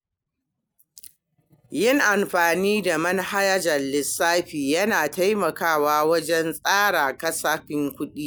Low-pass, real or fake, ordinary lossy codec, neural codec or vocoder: none; real; none; none